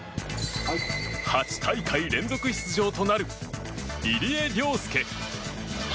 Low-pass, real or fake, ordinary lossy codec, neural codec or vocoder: none; real; none; none